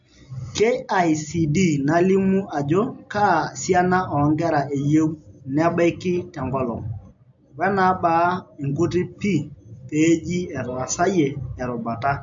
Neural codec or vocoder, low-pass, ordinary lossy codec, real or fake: none; 7.2 kHz; MP3, 48 kbps; real